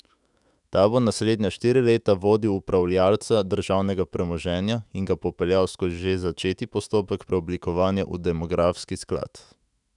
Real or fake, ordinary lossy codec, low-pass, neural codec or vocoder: fake; none; 10.8 kHz; autoencoder, 48 kHz, 128 numbers a frame, DAC-VAE, trained on Japanese speech